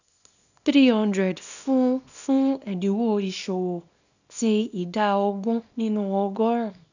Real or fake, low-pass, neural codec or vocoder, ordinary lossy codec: fake; 7.2 kHz; codec, 24 kHz, 0.9 kbps, WavTokenizer, small release; none